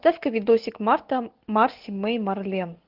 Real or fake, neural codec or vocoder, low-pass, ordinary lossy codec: real; none; 5.4 kHz; Opus, 32 kbps